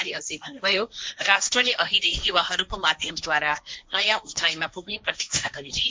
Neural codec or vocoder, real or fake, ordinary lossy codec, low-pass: codec, 16 kHz, 1.1 kbps, Voila-Tokenizer; fake; none; none